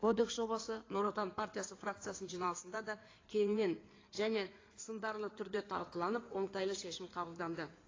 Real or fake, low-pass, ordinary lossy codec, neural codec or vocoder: fake; 7.2 kHz; AAC, 32 kbps; codec, 16 kHz in and 24 kHz out, 2.2 kbps, FireRedTTS-2 codec